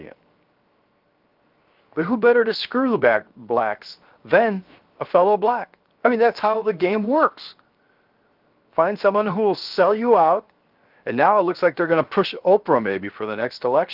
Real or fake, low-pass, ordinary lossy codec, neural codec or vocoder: fake; 5.4 kHz; Opus, 32 kbps; codec, 16 kHz, 0.7 kbps, FocalCodec